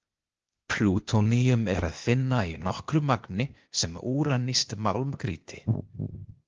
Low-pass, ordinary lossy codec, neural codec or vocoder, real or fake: 7.2 kHz; Opus, 24 kbps; codec, 16 kHz, 0.8 kbps, ZipCodec; fake